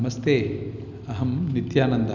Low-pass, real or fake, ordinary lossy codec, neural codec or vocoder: 7.2 kHz; real; none; none